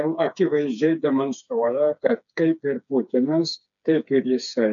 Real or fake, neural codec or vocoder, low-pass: fake; codec, 16 kHz, 4 kbps, FreqCodec, smaller model; 7.2 kHz